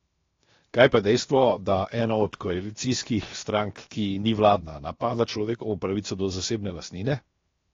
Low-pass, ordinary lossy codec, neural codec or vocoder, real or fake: 7.2 kHz; AAC, 32 kbps; codec, 16 kHz, 0.7 kbps, FocalCodec; fake